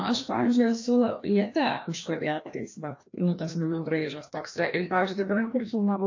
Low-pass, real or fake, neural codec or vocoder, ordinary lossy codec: 7.2 kHz; fake; codec, 16 kHz, 1 kbps, FreqCodec, larger model; AAC, 48 kbps